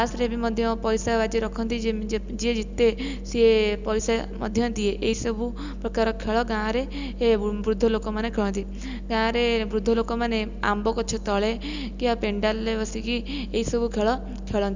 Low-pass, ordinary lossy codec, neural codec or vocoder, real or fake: 7.2 kHz; Opus, 64 kbps; none; real